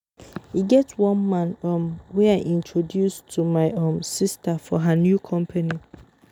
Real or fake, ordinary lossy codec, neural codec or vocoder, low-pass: real; none; none; 19.8 kHz